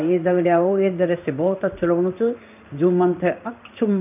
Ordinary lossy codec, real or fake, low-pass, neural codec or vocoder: none; fake; 3.6 kHz; codec, 16 kHz in and 24 kHz out, 1 kbps, XY-Tokenizer